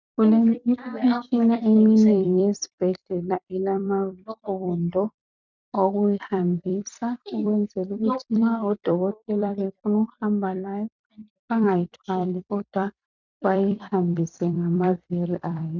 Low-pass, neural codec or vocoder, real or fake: 7.2 kHz; vocoder, 44.1 kHz, 128 mel bands every 256 samples, BigVGAN v2; fake